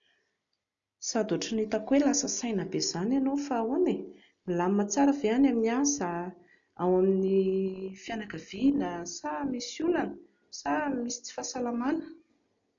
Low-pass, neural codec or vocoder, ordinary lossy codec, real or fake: 7.2 kHz; none; MP3, 96 kbps; real